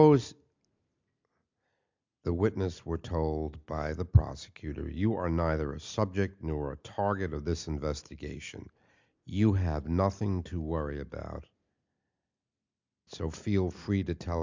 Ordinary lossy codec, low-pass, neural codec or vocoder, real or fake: MP3, 64 kbps; 7.2 kHz; none; real